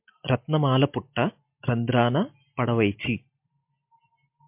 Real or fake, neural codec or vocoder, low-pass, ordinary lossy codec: real; none; 3.6 kHz; MP3, 32 kbps